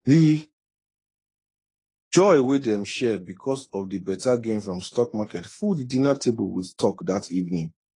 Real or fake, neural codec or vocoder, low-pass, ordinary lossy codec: fake; autoencoder, 48 kHz, 32 numbers a frame, DAC-VAE, trained on Japanese speech; 10.8 kHz; AAC, 32 kbps